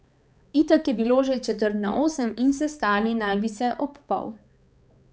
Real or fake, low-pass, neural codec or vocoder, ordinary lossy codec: fake; none; codec, 16 kHz, 4 kbps, X-Codec, HuBERT features, trained on balanced general audio; none